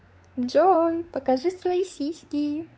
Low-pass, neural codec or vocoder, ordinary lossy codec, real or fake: none; codec, 16 kHz, 4 kbps, X-Codec, WavLM features, trained on Multilingual LibriSpeech; none; fake